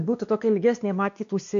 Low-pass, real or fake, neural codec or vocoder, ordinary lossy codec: 7.2 kHz; fake; codec, 16 kHz, 2 kbps, X-Codec, WavLM features, trained on Multilingual LibriSpeech; AAC, 48 kbps